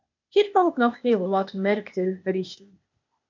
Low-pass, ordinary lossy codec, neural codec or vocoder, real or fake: 7.2 kHz; MP3, 64 kbps; codec, 16 kHz, 0.8 kbps, ZipCodec; fake